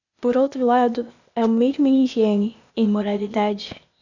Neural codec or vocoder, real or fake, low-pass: codec, 16 kHz, 0.8 kbps, ZipCodec; fake; 7.2 kHz